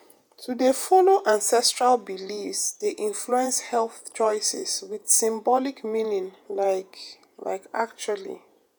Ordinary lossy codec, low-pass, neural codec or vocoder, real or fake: none; none; vocoder, 48 kHz, 128 mel bands, Vocos; fake